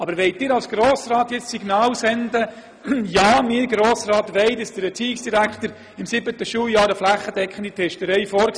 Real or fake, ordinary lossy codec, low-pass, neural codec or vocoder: real; none; none; none